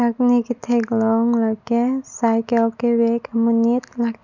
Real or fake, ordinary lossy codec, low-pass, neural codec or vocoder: real; none; 7.2 kHz; none